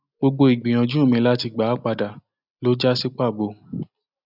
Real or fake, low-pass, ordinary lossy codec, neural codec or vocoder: real; 5.4 kHz; none; none